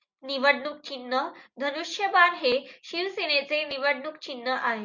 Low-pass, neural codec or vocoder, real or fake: 7.2 kHz; none; real